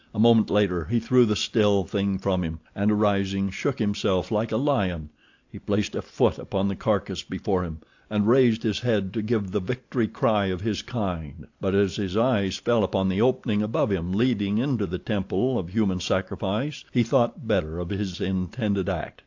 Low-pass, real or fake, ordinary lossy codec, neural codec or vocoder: 7.2 kHz; real; AAC, 48 kbps; none